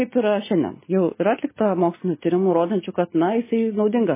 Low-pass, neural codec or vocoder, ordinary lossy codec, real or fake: 3.6 kHz; none; MP3, 16 kbps; real